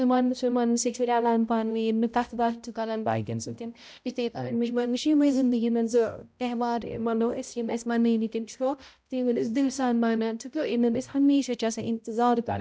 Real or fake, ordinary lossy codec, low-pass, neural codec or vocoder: fake; none; none; codec, 16 kHz, 0.5 kbps, X-Codec, HuBERT features, trained on balanced general audio